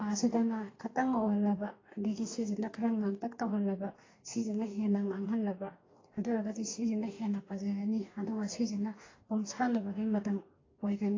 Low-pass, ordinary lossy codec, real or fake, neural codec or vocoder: 7.2 kHz; AAC, 32 kbps; fake; codec, 44.1 kHz, 2.6 kbps, DAC